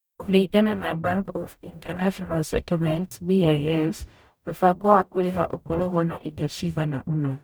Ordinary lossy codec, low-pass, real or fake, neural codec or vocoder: none; none; fake; codec, 44.1 kHz, 0.9 kbps, DAC